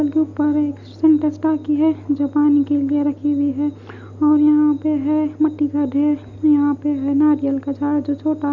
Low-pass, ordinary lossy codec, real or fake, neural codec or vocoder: 7.2 kHz; none; real; none